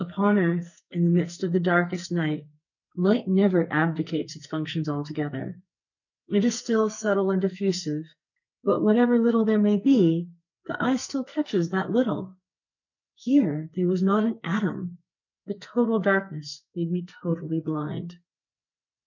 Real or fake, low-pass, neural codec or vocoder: fake; 7.2 kHz; codec, 44.1 kHz, 2.6 kbps, SNAC